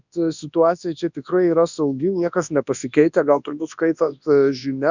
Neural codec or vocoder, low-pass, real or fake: codec, 24 kHz, 0.9 kbps, WavTokenizer, large speech release; 7.2 kHz; fake